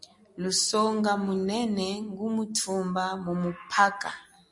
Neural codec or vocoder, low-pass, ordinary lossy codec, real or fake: none; 10.8 kHz; MP3, 48 kbps; real